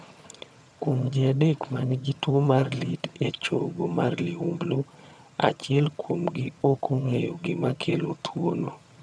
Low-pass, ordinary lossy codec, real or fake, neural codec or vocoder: none; none; fake; vocoder, 22.05 kHz, 80 mel bands, HiFi-GAN